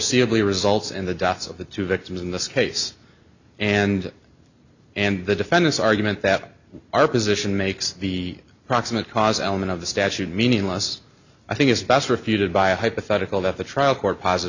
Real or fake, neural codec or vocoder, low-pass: real; none; 7.2 kHz